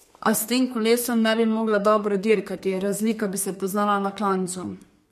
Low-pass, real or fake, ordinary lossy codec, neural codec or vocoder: 14.4 kHz; fake; MP3, 64 kbps; codec, 32 kHz, 1.9 kbps, SNAC